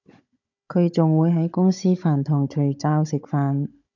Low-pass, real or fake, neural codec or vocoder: 7.2 kHz; fake; codec, 16 kHz, 16 kbps, FunCodec, trained on Chinese and English, 50 frames a second